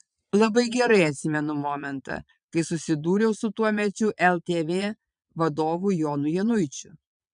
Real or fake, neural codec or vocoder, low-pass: fake; vocoder, 22.05 kHz, 80 mel bands, Vocos; 9.9 kHz